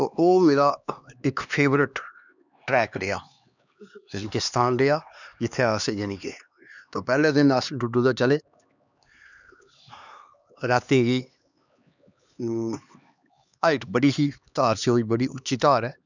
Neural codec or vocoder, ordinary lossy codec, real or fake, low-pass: codec, 16 kHz, 2 kbps, X-Codec, HuBERT features, trained on LibriSpeech; none; fake; 7.2 kHz